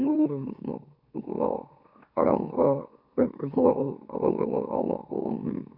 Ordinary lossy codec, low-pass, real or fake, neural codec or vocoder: none; 5.4 kHz; fake; autoencoder, 44.1 kHz, a latent of 192 numbers a frame, MeloTTS